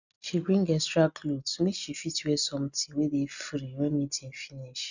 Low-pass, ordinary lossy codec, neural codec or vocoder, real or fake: 7.2 kHz; none; none; real